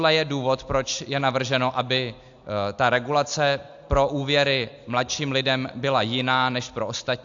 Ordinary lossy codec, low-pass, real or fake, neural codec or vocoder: AAC, 64 kbps; 7.2 kHz; real; none